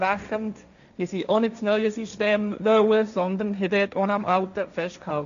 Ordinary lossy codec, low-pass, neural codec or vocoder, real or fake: none; 7.2 kHz; codec, 16 kHz, 1.1 kbps, Voila-Tokenizer; fake